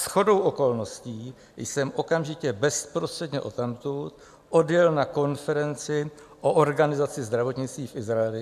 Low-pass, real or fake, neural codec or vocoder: 14.4 kHz; real; none